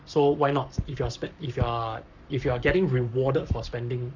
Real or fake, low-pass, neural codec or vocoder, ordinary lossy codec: fake; 7.2 kHz; codec, 44.1 kHz, 7.8 kbps, Pupu-Codec; none